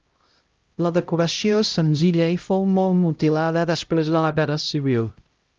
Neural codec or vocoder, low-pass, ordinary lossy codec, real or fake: codec, 16 kHz, 0.5 kbps, X-Codec, HuBERT features, trained on LibriSpeech; 7.2 kHz; Opus, 24 kbps; fake